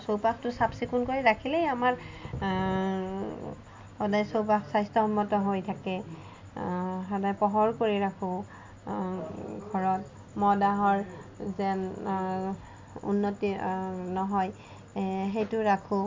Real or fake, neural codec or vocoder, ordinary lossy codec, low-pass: real; none; MP3, 48 kbps; 7.2 kHz